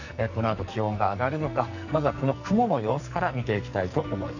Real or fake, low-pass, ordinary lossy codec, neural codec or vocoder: fake; 7.2 kHz; none; codec, 44.1 kHz, 2.6 kbps, SNAC